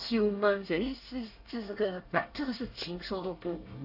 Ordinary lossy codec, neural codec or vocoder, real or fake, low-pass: AAC, 48 kbps; codec, 24 kHz, 1 kbps, SNAC; fake; 5.4 kHz